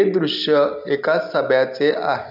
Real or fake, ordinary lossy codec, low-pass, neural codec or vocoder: real; none; 5.4 kHz; none